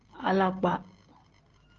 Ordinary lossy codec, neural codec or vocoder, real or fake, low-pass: Opus, 16 kbps; none; real; 7.2 kHz